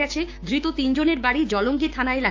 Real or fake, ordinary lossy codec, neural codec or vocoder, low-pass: fake; AAC, 48 kbps; codec, 16 kHz, 6 kbps, DAC; 7.2 kHz